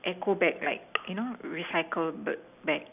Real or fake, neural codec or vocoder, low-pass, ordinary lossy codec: real; none; 3.6 kHz; none